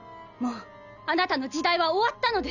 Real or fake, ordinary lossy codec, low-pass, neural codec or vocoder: real; none; 7.2 kHz; none